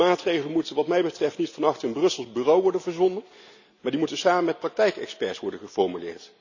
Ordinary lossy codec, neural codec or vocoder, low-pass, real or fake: none; none; 7.2 kHz; real